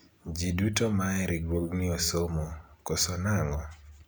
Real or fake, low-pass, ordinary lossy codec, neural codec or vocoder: real; none; none; none